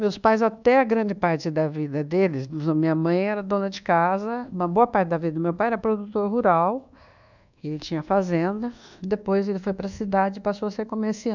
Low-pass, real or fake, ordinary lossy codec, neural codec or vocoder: 7.2 kHz; fake; none; codec, 24 kHz, 1.2 kbps, DualCodec